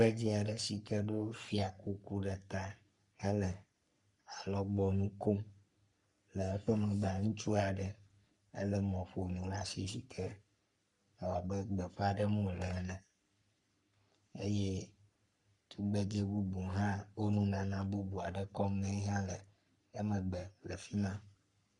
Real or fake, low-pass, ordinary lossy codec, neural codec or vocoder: fake; 10.8 kHz; Opus, 64 kbps; codec, 44.1 kHz, 3.4 kbps, Pupu-Codec